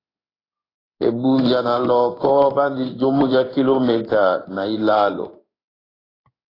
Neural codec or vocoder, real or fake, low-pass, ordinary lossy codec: codec, 16 kHz in and 24 kHz out, 1 kbps, XY-Tokenizer; fake; 5.4 kHz; AAC, 24 kbps